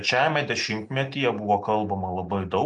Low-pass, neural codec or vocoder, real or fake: 10.8 kHz; none; real